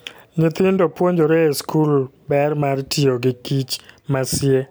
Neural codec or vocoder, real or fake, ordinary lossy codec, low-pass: none; real; none; none